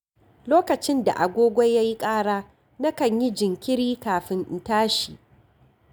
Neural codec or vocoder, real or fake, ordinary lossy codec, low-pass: none; real; none; none